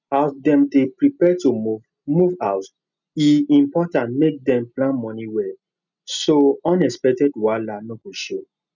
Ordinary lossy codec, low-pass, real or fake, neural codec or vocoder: none; 7.2 kHz; real; none